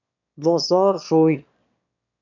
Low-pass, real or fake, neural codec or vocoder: 7.2 kHz; fake; autoencoder, 22.05 kHz, a latent of 192 numbers a frame, VITS, trained on one speaker